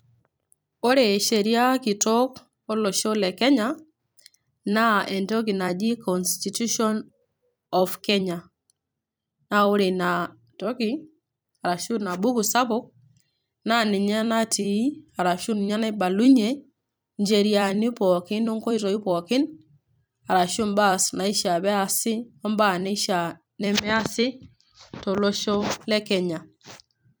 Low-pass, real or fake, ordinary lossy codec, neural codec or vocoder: none; fake; none; vocoder, 44.1 kHz, 128 mel bands every 256 samples, BigVGAN v2